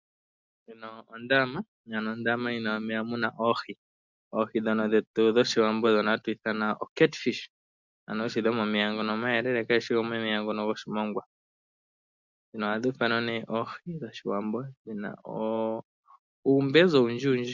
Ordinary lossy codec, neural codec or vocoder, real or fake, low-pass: MP3, 64 kbps; none; real; 7.2 kHz